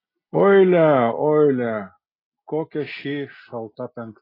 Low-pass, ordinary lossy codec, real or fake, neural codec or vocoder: 5.4 kHz; AAC, 24 kbps; real; none